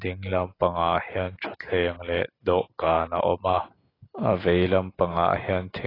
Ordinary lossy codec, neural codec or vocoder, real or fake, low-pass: AAC, 24 kbps; none; real; 5.4 kHz